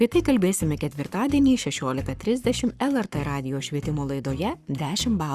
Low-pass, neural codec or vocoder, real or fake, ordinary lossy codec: 14.4 kHz; codec, 44.1 kHz, 7.8 kbps, Pupu-Codec; fake; Opus, 64 kbps